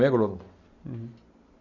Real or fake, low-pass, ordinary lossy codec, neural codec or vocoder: real; 7.2 kHz; none; none